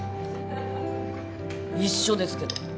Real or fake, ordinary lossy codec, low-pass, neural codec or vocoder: real; none; none; none